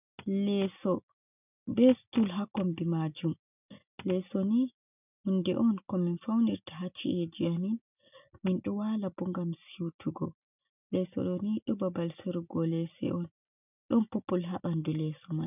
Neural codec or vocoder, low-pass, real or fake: none; 3.6 kHz; real